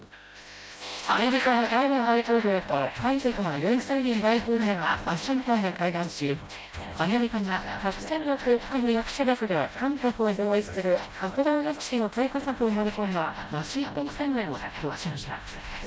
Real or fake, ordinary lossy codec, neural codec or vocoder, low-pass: fake; none; codec, 16 kHz, 0.5 kbps, FreqCodec, smaller model; none